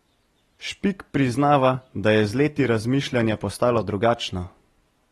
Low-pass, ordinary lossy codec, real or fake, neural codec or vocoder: 19.8 kHz; AAC, 32 kbps; real; none